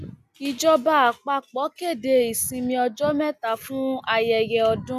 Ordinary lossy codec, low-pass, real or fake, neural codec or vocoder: none; 14.4 kHz; real; none